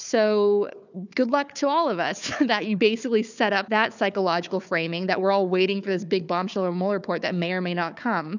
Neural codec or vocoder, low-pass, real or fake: codec, 16 kHz, 4 kbps, FunCodec, trained on Chinese and English, 50 frames a second; 7.2 kHz; fake